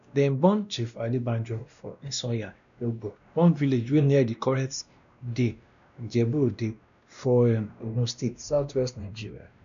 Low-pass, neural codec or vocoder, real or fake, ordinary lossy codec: 7.2 kHz; codec, 16 kHz, 1 kbps, X-Codec, WavLM features, trained on Multilingual LibriSpeech; fake; none